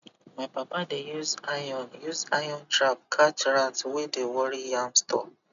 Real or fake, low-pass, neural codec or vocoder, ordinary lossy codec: real; 7.2 kHz; none; none